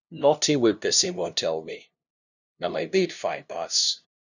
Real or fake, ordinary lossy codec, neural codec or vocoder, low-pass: fake; none; codec, 16 kHz, 0.5 kbps, FunCodec, trained on LibriTTS, 25 frames a second; 7.2 kHz